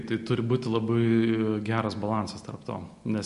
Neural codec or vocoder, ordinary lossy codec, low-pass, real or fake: none; MP3, 48 kbps; 10.8 kHz; real